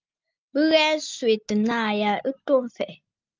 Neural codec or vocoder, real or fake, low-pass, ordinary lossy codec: none; real; 7.2 kHz; Opus, 24 kbps